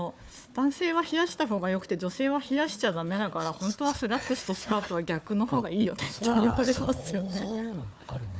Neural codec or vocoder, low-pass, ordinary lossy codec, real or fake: codec, 16 kHz, 4 kbps, FunCodec, trained on Chinese and English, 50 frames a second; none; none; fake